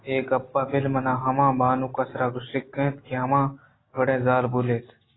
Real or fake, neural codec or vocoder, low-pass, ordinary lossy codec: real; none; 7.2 kHz; AAC, 16 kbps